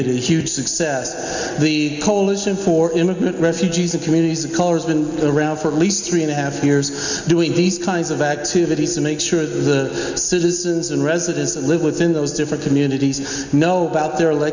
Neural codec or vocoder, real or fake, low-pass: none; real; 7.2 kHz